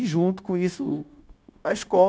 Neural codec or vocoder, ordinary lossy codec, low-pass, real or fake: codec, 16 kHz, 0.9 kbps, LongCat-Audio-Codec; none; none; fake